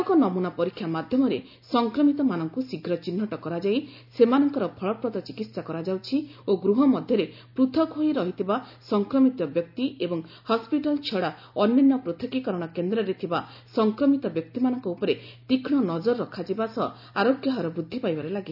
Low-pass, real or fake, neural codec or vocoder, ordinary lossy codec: 5.4 kHz; real; none; none